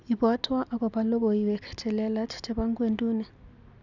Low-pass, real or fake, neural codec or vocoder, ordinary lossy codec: 7.2 kHz; real; none; none